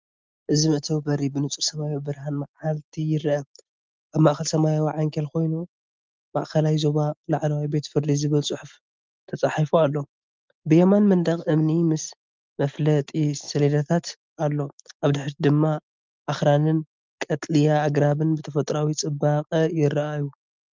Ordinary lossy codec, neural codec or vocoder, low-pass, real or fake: Opus, 32 kbps; none; 7.2 kHz; real